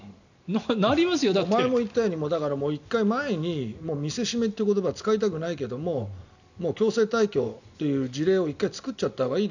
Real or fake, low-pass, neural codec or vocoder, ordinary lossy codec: real; 7.2 kHz; none; none